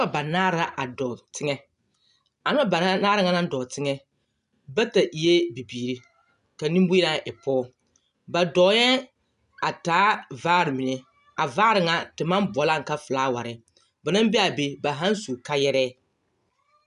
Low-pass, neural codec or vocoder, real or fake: 10.8 kHz; none; real